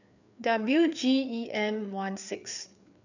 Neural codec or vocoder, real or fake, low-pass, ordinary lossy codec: codec, 16 kHz, 4 kbps, FreqCodec, larger model; fake; 7.2 kHz; none